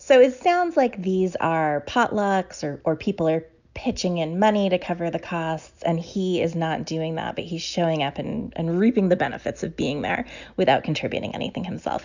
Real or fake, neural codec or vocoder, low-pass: real; none; 7.2 kHz